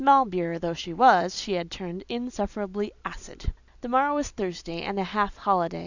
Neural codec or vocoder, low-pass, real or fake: none; 7.2 kHz; real